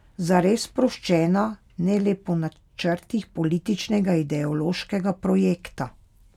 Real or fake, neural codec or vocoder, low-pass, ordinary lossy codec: real; none; 19.8 kHz; none